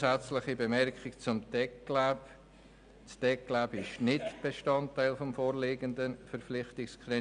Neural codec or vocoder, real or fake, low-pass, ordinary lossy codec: none; real; 9.9 kHz; none